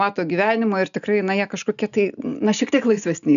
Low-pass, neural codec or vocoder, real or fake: 7.2 kHz; none; real